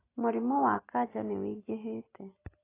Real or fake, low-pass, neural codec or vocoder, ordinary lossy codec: real; 3.6 kHz; none; AAC, 16 kbps